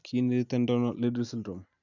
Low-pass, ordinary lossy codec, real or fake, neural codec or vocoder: 7.2 kHz; none; real; none